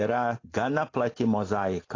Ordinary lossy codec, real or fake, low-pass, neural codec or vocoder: AAC, 32 kbps; real; 7.2 kHz; none